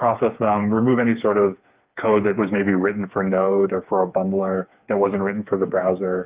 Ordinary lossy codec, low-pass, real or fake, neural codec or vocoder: Opus, 24 kbps; 3.6 kHz; fake; codec, 16 kHz, 4 kbps, FreqCodec, smaller model